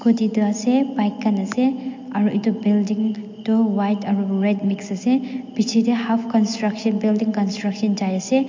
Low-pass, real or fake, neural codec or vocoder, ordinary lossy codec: 7.2 kHz; real; none; MP3, 48 kbps